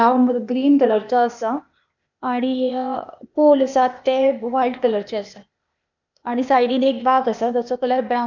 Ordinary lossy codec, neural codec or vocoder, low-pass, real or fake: none; codec, 16 kHz, 0.8 kbps, ZipCodec; 7.2 kHz; fake